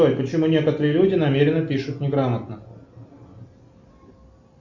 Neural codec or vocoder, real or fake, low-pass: none; real; 7.2 kHz